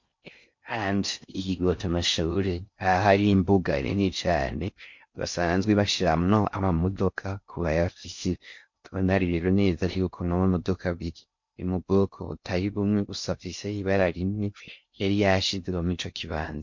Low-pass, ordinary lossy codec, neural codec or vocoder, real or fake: 7.2 kHz; MP3, 48 kbps; codec, 16 kHz in and 24 kHz out, 0.6 kbps, FocalCodec, streaming, 4096 codes; fake